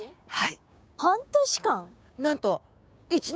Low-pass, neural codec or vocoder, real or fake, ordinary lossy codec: none; codec, 16 kHz, 6 kbps, DAC; fake; none